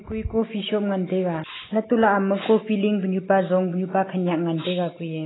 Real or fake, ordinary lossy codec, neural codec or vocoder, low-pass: real; AAC, 16 kbps; none; 7.2 kHz